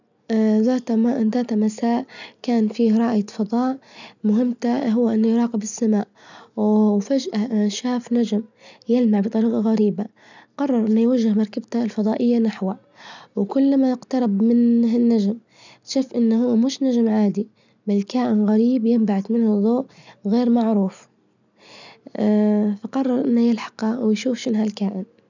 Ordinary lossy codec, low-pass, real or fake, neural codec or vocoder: none; 7.2 kHz; real; none